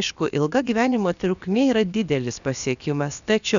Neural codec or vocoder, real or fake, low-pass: codec, 16 kHz, about 1 kbps, DyCAST, with the encoder's durations; fake; 7.2 kHz